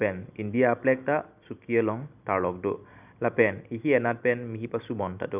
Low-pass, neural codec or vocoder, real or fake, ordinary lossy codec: 3.6 kHz; none; real; none